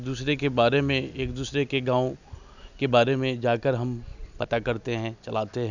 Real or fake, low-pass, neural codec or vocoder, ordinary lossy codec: real; 7.2 kHz; none; none